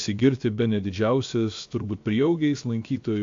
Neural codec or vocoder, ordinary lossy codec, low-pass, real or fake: codec, 16 kHz, 0.7 kbps, FocalCodec; AAC, 48 kbps; 7.2 kHz; fake